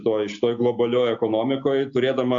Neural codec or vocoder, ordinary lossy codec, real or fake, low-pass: none; AAC, 64 kbps; real; 7.2 kHz